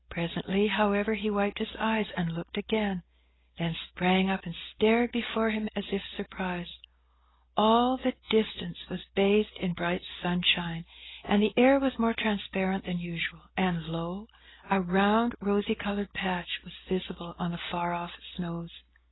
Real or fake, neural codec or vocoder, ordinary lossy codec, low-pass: real; none; AAC, 16 kbps; 7.2 kHz